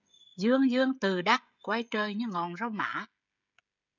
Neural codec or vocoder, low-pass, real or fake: codec, 16 kHz, 16 kbps, FreqCodec, smaller model; 7.2 kHz; fake